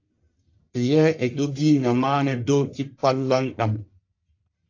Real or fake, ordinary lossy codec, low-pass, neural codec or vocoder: fake; AAC, 48 kbps; 7.2 kHz; codec, 44.1 kHz, 1.7 kbps, Pupu-Codec